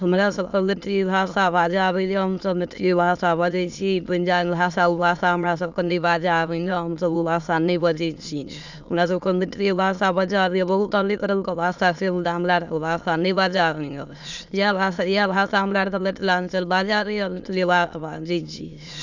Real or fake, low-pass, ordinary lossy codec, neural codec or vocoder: fake; 7.2 kHz; none; autoencoder, 22.05 kHz, a latent of 192 numbers a frame, VITS, trained on many speakers